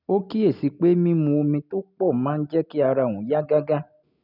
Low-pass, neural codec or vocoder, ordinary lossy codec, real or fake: 5.4 kHz; none; none; real